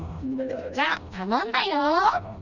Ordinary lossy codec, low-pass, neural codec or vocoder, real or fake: none; 7.2 kHz; codec, 16 kHz, 1 kbps, FreqCodec, smaller model; fake